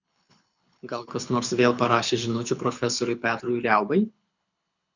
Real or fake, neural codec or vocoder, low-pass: fake; codec, 24 kHz, 6 kbps, HILCodec; 7.2 kHz